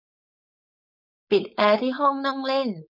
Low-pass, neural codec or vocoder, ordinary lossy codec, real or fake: 5.4 kHz; codec, 16 kHz, 4.8 kbps, FACodec; none; fake